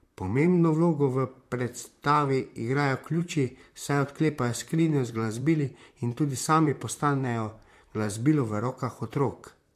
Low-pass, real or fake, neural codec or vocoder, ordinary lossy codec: 14.4 kHz; fake; vocoder, 44.1 kHz, 128 mel bands, Pupu-Vocoder; MP3, 64 kbps